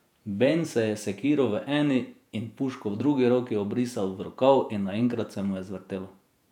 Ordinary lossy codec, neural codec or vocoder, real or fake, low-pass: none; vocoder, 48 kHz, 128 mel bands, Vocos; fake; 19.8 kHz